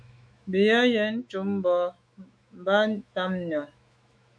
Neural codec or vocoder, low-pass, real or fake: autoencoder, 48 kHz, 128 numbers a frame, DAC-VAE, trained on Japanese speech; 9.9 kHz; fake